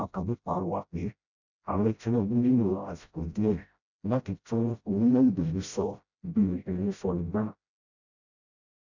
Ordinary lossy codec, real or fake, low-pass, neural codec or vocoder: none; fake; 7.2 kHz; codec, 16 kHz, 0.5 kbps, FreqCodec, smaller model